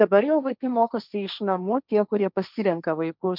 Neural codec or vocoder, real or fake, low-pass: codec, 16 kHz, 1.1 kbps, Voila-Tokenizer; fake; 5.4 kHz